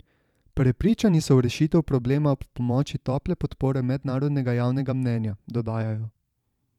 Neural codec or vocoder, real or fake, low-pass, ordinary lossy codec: vocoder, 44.1 kHz, 128 mel bands every 512 samples, BigVGAN v2; fake; 19.8 kHz; none